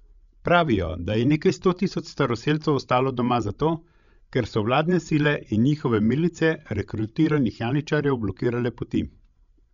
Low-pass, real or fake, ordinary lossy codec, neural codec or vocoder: 7.2 kHz; fake; none; codec, 16 kHz, 16 kbps, FreqCodec, larger model